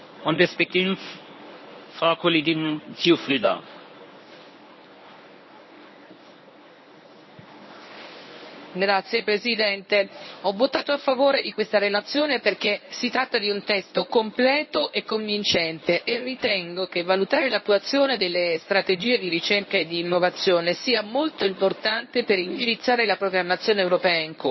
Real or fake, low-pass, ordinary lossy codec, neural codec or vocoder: fake; 7.2 kHz; MP3, 24 kbps; codec, 24 kHz, 0.9 kbps, WavTokenizer, medium speech release version 1